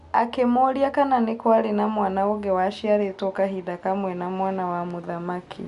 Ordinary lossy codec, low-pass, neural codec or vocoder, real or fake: Opus, 64 kbps; 10.8 kHz; none; real